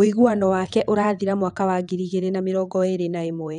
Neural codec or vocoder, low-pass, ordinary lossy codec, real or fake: vocoder, 22.05 kHz, 80 mel bands, WaveNeXt; 9.9 kHz; none; fake